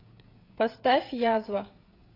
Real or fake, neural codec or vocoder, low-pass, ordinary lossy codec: fake; codec, 16 kHz, 16 kbps, FreqCodec, smaller model; 5.4 kHz; AAC, 24 kbps